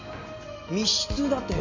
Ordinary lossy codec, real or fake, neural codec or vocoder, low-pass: MP3, 64 kbps; real; none; 7.2 kHz